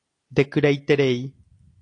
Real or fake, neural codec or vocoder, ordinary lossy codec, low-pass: real; none; AAC, 48 kbps; 9.9 kHz